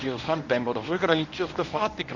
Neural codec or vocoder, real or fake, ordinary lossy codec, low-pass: codec, 24 kHz, 0.9 kbps, WavTokenizer, medium speech release version 1; fake; none; 7.2 kHz